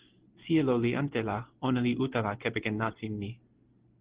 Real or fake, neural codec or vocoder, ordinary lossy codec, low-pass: real; none; Opus, 16 kbps; 3.6 kHz